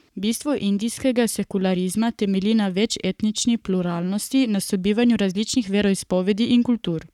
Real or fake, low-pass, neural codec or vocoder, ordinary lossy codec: fake; 19.8 kHz; codec, 44.1 kHz, 7.8 kbps, Pupu-Codec; none